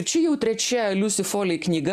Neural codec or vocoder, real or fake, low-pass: none; real; 14.4 kHz